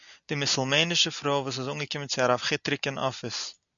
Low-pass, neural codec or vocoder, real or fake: 7.2 kHz; none; real